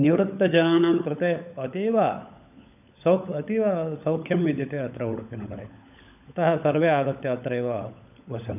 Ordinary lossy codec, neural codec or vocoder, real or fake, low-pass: none; codec, 16 kHz, 16 kbps, FunCodec, trained on LibriTTS, 50 frames a second; fake; 3.6 kHz